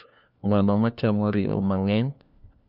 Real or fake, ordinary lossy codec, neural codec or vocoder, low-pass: fake; none; codec, 16 kHz, 1 kbps, FunCodec, trained on LibriTTS, 50 frames a second; 5.4 kHz